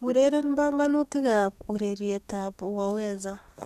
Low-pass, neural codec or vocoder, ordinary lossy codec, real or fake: 14.4 kHz; codec, 32 kHz, 1.9 kbps, SNAC; none; fake